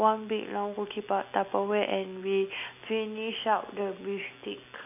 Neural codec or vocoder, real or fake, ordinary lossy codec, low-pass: none; real; none; 3.6 kHz